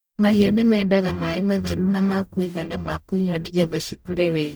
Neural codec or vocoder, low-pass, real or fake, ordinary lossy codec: codec, 44.1 kHz, 0.9 kbps, DAC; none; fake; none